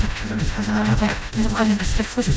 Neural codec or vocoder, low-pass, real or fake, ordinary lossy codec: codec, 16 kHz, 0.5 kbps, FreqCodec, smaller model; none; fake; none